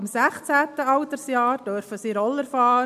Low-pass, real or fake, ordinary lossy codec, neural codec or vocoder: 14.4 kHz; real; none; none